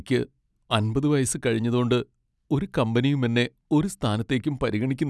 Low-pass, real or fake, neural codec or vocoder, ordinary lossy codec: none; real; none; none